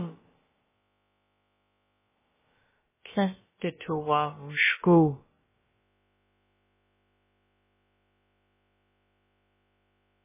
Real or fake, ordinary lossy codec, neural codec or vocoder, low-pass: fake; MP3, 16 kbps; codec, 16 kHz, about 1 kbps, DyCAST, with the encoder's durations; 3.6 kHz